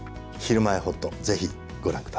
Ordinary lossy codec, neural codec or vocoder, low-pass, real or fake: none; none; none; real